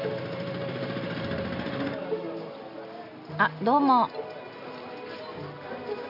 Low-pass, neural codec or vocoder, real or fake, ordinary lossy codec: 5.4 kHz; none; real; none